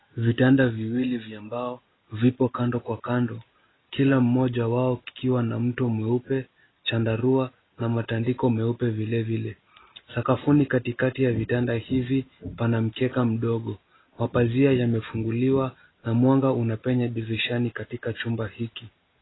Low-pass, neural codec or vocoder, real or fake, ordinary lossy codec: 7.2 kHz; none; real; AAC, 16 kbps